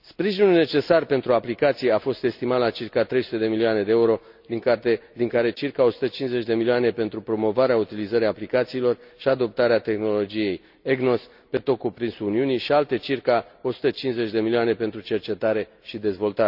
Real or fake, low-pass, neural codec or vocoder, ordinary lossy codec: real; 5.4 kHz; none; none